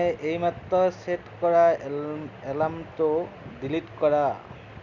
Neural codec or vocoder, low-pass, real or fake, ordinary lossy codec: none; 7.2 kHz; real; none